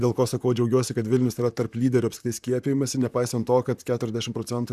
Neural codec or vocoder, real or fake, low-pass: vocoder, 44.1 kHz, 128 mel bands, Pupu-Vocoder; fake; 14.4 kHz